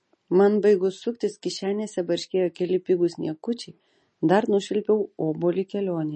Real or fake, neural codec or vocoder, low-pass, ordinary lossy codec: real; none; 9.9 kHz; MP3, 32 kbps